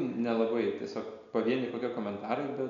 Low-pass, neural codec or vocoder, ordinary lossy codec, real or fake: 7.2 kHz; none; MP3, 96 kbps; real